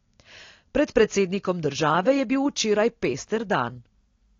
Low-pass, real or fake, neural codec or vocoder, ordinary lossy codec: 7.2 kHz; real; none; AAC, 32 kbps